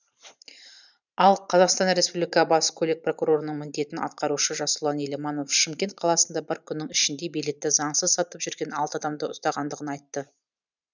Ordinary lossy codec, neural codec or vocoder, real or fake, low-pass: none; vocoder, 44.1 kHz, 128 mel bands every 512 samples, BigVGAN v2; fake; 7.2 kHz